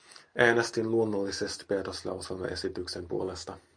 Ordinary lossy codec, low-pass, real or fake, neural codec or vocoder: MP3, 48 kbps; 9.9 kHz; real; none